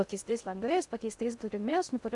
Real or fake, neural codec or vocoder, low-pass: fake; codec, 16 kHz in and 24 kHz out, 0.6 kbps, FocalCodec, streaming, 2048 codes; 10.8 kHz